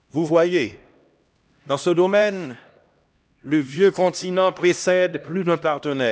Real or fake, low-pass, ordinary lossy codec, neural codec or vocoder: fake; none; none; codec, 16 kHz, 1 kbps, X-Codec, HuBERT features, trained on LibriSpeech